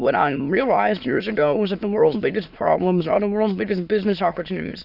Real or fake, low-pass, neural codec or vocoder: fake; 5.4 kHz; autoencoder, 22.05 kHz, a latent of 192 numbers a frame, VITS, trained on many speakers